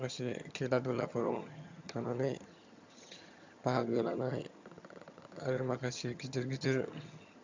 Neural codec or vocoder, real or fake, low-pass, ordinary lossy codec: vocoder, 22.05 kHz, 80 mel bands, HiFi-GAN; fake; 7.2 kHz; MP3, 64 kbps